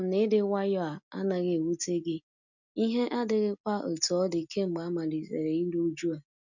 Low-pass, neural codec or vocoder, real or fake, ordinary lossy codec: 7.2 kHz; none; real; none